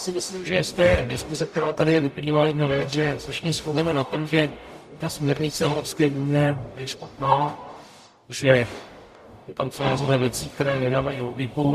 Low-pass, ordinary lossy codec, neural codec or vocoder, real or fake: 14.4 kHz; Opus, 64 kbps; codec, 44.1 kHz, 0.9 kbps, DAC; fake